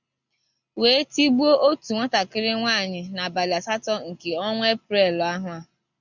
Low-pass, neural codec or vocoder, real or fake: 7.2 kHz; none; real